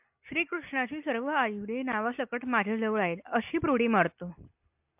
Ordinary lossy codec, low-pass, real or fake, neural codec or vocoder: AAC, 32 kbps; 3.6 kHz; real; none